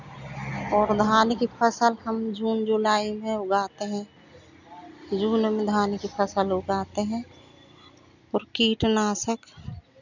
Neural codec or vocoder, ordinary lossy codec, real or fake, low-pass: none; none; real; 7.2 kHz